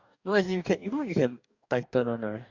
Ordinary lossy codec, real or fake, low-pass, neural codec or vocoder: none; fake; 7.2 kHz; codec, 44.1 kHz, 2.6 kbps, DAC